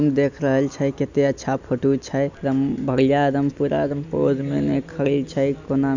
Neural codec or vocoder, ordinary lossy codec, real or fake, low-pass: none; none; real; 7.2 kHz